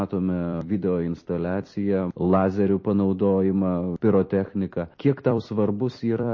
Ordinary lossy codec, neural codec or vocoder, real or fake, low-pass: MP3, 32 kbps; vocoder, 44.1 kHz, 128 mel bands every 256 samples, BigVGAN v2; fake; 7.2 kHz